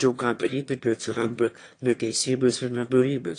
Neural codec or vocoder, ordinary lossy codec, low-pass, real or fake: autoencoder, 22.05 kHz, a latent of 192 numbers a frame, VITS, trained on one speaker; AAC, 48 kbps; 9.9 kHz; fake